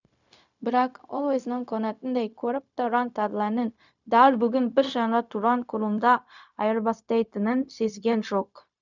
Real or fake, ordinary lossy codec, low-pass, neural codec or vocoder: fake; none; 7.2 kHz; codec, 16 kHz, 0.4 kbps, LongCat-Audio-Codec